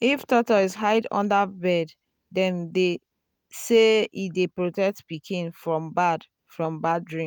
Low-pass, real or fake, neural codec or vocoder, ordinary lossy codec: none; real; none; none